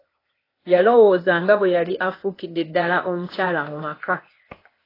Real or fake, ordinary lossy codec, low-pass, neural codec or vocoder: fake; AAC, 24 kbps; 5.4 kHz; codec, 16 kHz, 0.8 kbps, ZipCodec